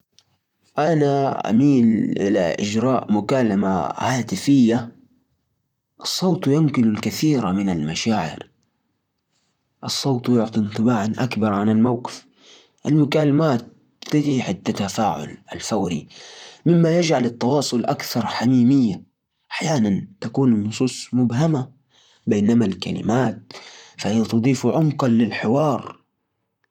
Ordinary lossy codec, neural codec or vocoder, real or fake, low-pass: none; vocoder, 44.1 kHz, 128 mel bands, Pupu-Vocoder; fake; 19.8 kHz